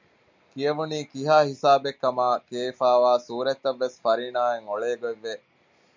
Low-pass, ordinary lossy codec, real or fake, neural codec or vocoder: 7.2 kHz; MP3, 64 kbps; real; none